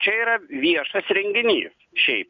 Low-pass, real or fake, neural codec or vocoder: 7.2 kHz; real; none